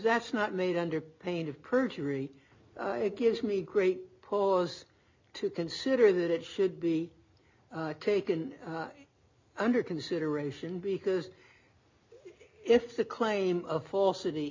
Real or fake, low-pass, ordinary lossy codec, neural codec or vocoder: real; 7.2 kHz; MP3, 48 kbps; none